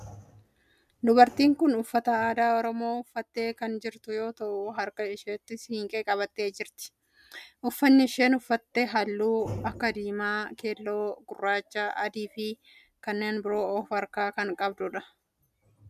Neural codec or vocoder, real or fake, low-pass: none; real; 14.4 kHz